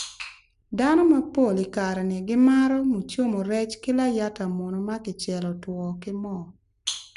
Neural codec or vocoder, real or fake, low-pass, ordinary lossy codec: none; real; 10.8 kHz; none